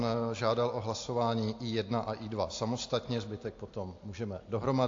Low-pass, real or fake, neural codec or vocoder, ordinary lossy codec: 7.2 kHz; real; none; AAC, 48 kbps